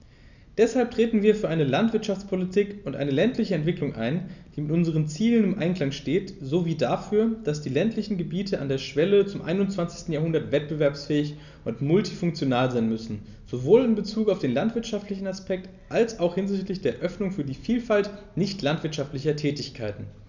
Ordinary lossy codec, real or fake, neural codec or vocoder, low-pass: none; real; none; 7.2 kHz